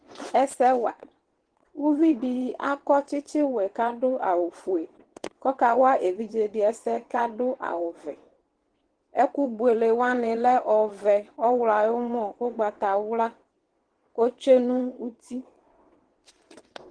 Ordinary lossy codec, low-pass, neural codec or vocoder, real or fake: Opus, 16 kbps; 9.9 kHz; vocoder, 22.05 kHz, 80 mel bands, WaveNeXt; fake